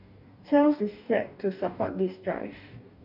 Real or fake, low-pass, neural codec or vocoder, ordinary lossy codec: fake; 5.4 kHz; codec, 44.1 kHz, 2.6 kbps, DAC; none